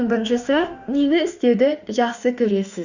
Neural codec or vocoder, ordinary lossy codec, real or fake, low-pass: autoencoder, 48 kHz, 32 numbers a frame, DAC-VAE, trained on Japanese speech; Opus, 64 kbps; fake; 7.2 kHz